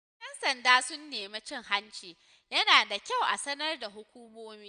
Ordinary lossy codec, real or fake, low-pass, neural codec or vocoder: none; real; 9.9 kHz; none